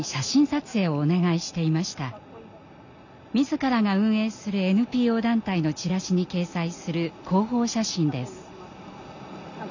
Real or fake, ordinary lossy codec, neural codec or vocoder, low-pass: real; none; none; 7.2 kHz